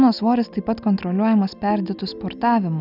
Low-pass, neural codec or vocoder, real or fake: 5.4 kHz; none; real